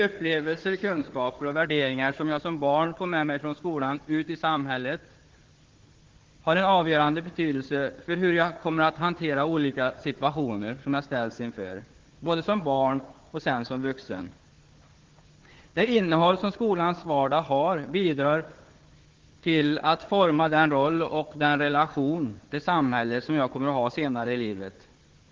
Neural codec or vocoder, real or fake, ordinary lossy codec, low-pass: codec, 16 kHz, 4 kbps, FunCodec, trained on Chinese and English, 50 frames a second; fake; Opus, 16 kbps; 7.2 kHz